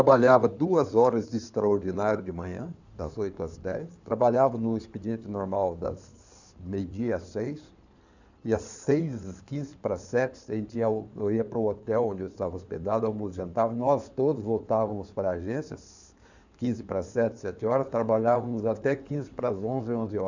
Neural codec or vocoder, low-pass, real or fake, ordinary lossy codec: codec, 16 kHz in and 24 kHz out, 2.2 kbps, FireRedTTS-2 codec; 7.2 kHz; fake; none